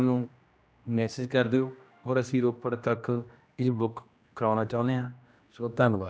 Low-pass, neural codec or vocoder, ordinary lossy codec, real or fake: none; codec, 16 kHz, 1 kbps, X-Codec, HuBERT features, trained on general audio; none; fake